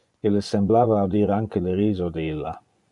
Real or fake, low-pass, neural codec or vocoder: fake; 10.8 kHz; vocoder, 24 kHz, 100 mel bands, Vocos